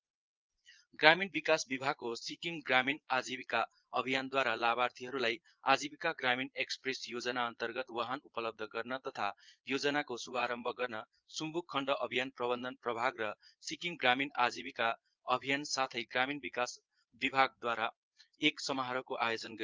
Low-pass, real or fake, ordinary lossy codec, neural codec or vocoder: 7.2 kHz; fake; Opus, 32 kbps; vocoder, 22.05 kHz, 80 mel bands, Vocos